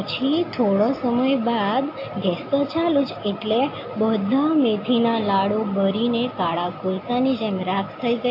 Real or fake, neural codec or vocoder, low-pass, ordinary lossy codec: real; none; 5.4 kHz; AAC, 32 kbps